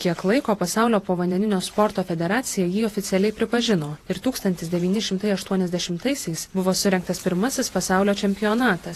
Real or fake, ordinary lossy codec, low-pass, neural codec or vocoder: fake; AAC, 48 kbps; 14.4 kHz; vocoder, 48 kHz, 128 mel bands, Vocos